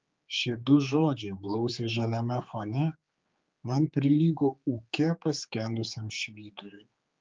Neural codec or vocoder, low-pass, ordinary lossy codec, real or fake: codec, 16 kHz, 4 kbps, X-Codec, HuBERT features, trained on general audio; 7.2 kHz; Opus, 24 kbps; fake